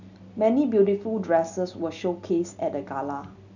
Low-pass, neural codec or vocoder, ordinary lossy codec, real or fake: 7.2 kHz; none; none; real